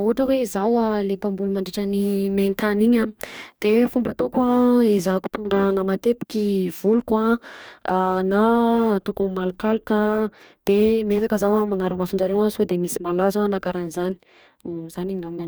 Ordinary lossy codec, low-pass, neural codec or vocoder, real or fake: none; none; codec, 44.1 kHz, 2.6 kbps, DAC; fake